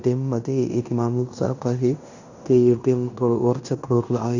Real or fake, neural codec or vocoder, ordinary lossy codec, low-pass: fake; codec, 16 kHz in and 24 kHz out, 0.9 kbps, LongCat-Audio-Codec, fine tuned four codebook decoder; none; 7.2 kHz